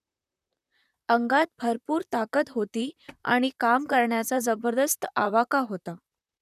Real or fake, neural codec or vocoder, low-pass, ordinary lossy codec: fake; vocoder, 44.1 kHz, 128 mel bands, Pupu-Vocoder; 14.4 kHz; none